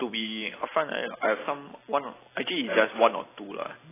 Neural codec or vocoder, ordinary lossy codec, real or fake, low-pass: none; AAC, 16 kbps; real; 3.6 kHz